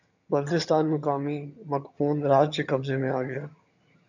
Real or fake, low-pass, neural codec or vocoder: fake; 7.2 kHz; vocoder, 22.05 kHz, 80 mel bands, HiFi-GAN